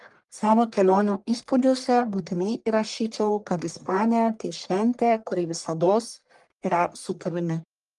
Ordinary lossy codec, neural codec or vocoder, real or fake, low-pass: Opus, 32 kbps; codec, 44.1 kHz, 1.7 kbps, Pupu-Codec; fake; 10.8 kHz